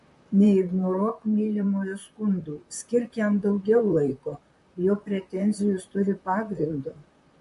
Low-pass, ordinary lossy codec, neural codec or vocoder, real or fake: 14.4 kHz; MP3, 48 kbps; vocoder, 44.1 kHz, 128 mel bands, Pupu-Vocoder; fake